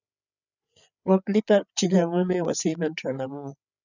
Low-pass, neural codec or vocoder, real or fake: 7.2 kHz; codec, 16 kHz, 8 kbps, FreqCodec, larger model; fake